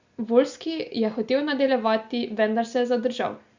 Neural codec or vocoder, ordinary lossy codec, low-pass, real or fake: none; Opus, 64 kbps; 7.2 kHz; real